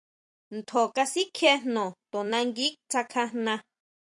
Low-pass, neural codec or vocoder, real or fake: 10.8 kHz; vocoder, 44.1 kHz, 128 mel bands every 256 samples, BigVGAN v2; fake